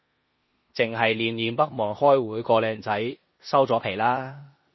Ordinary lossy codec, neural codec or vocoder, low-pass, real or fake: MP3, 24 kbps; codec, 16 kHz in and 24 kHz out, 0.9 kbps, LongCat-Audio-Codec, four codebook decoder; 7.2 kHz; fake